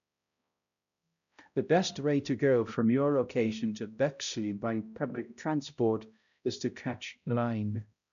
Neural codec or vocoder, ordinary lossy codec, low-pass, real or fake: codec, 16 kHz, 0.5 kbps, X-Codec, HuBERT features, trained on balanced general audio; none; 7.2 kHz; fake